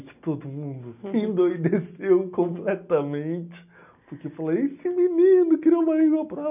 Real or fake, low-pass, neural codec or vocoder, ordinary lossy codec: real; 3.6 kHz; none; none